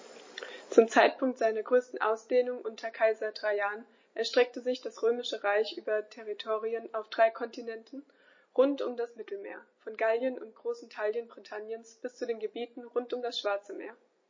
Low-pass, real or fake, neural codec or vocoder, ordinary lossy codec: 7.2 kHz; real; none; MP3, 32 kbps